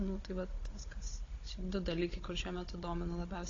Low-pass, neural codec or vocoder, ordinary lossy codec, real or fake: 7.2 kHz; codec, 16 kHz, 16 kbps, FunCodec, trained on Chinese and English, 50 frames a second; AAC, 32 kbps; fake